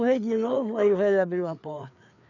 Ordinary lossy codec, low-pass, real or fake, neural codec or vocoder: none; 7.2 kHz; fake; codec, 16 kHz, 4 kbps, FreqCodec, larger model